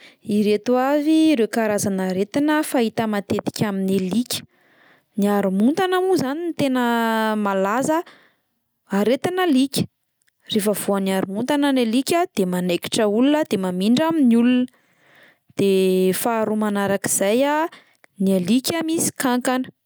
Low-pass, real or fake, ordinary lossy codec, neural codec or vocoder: none; real; none; none